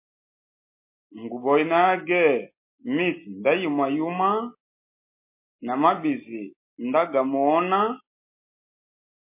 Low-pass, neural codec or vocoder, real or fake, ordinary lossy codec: 3.6 kHz; none; real; MP3, 24 kbps